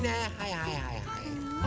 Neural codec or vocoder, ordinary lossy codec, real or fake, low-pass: none; none; real; none